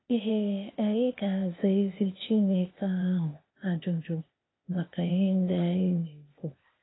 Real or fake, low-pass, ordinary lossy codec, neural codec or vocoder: fake; 7.2 kHz; AAC, 16 kbps; codec, 16 kHz, 0.8 kbps, ZipCodec